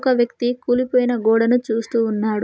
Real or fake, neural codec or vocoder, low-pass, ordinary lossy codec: real; none; none; none